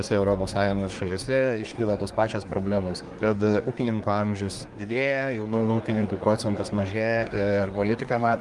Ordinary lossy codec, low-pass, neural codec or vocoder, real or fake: Opus, 32 kbps; 10.8 kHz; codec, 24 kHz, 1 kbps, SNAC; fake